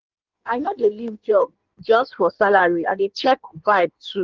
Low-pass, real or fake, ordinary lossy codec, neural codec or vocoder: 7.2 kHz; fake; Opus, 32 kbps; codec, 16 kHz in and 24 kHz out, 1.1 kbps, FireRedTTS-2 codec